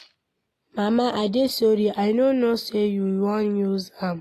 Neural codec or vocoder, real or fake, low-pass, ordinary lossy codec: none; real; 14.4 kHz; AAC, 48 kbps